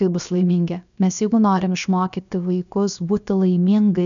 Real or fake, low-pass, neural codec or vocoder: fake; 7.2 kHz; codec, 16 kHz, about 1 kbps, DyCAST, with the encoder's durations